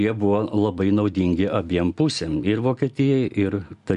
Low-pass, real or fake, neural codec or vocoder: 10.8 kHz; real; none